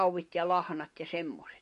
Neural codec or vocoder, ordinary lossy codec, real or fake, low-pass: none; MP3, 48 kbps; real; 14.4 kHz